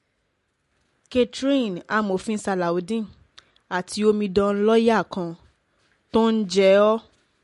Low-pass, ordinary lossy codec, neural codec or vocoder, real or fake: 14.4 kHz; MP3, 48 kbps; none; real